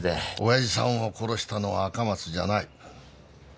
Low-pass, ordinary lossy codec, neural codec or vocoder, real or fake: none; none; none; real